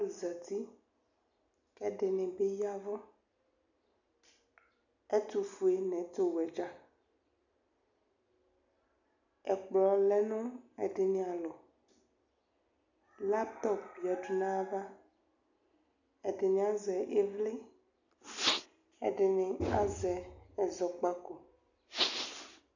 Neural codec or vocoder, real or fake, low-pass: none; real; 7.2 kHz